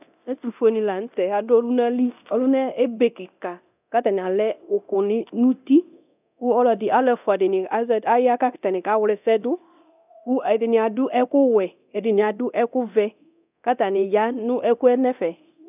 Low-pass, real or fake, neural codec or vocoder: 3.6 kHz; fake; codec, 24 kHz, 0.9 kbps, DualCodec